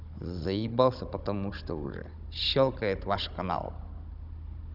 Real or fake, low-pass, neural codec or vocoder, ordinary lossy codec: fake; 5.4 kHz; codec, 16 kHz, 16 kbps, FunCodec, trained on Chinese and English, 50 frames a second; none